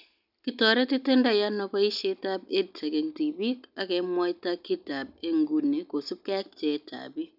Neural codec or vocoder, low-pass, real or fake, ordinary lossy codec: none; 5.4 kHz; real; none